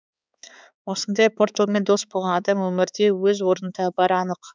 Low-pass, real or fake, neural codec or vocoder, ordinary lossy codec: none; fake; codec, 16 kHz, 4 kbps, X-Codec, HuBERT features, trained on balanced general audio; none